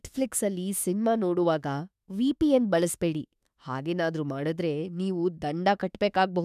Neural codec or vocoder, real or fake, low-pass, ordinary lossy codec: autoencoder, 48 kHz, 32 numbers a frame, DAC-VAE, trained on Japanese speech; fake; 14.4 kHz; none